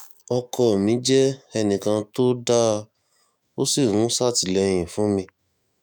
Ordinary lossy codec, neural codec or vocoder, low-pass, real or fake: none; autoencoder, 48 kHz, 128 numbers a frame, DAC-VAE, trained on Japanese speech; none; fake